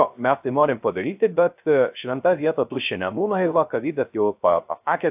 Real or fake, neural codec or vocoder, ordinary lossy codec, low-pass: fake; codec, 16 kHz, 0.3 kbps, FocalCodec; AAC, 32 kbps; 3.6 kHz